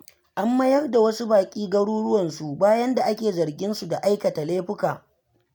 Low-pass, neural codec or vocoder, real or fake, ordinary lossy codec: none; none; real; none